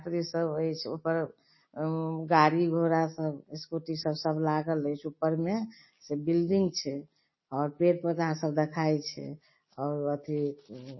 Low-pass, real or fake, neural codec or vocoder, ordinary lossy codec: 7.2 kHz; real; none; MP3, 24 kbps